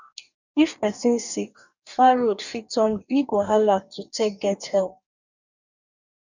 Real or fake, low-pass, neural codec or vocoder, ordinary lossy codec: fake; 7.2 kHz; codec, 44.1 kHz, 2.6 kbps, DAC; none